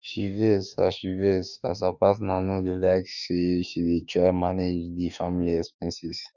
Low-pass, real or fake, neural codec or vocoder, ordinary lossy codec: 7.2 kHz; fake; autoencoder, 48 kHz, 32 numbers a frame, DAC-VAE, trained on Japanese speech; none